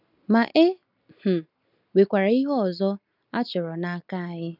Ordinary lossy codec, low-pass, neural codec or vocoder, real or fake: none; 5.4 kHz; none; real